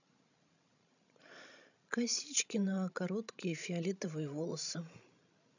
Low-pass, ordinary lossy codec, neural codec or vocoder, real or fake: 7.2 kHz; none; codec, 16 kHz, 16 kbps, FreqCodec, larger model; fake